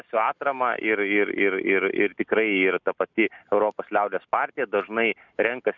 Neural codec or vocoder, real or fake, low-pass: none; real; 7.2 kHz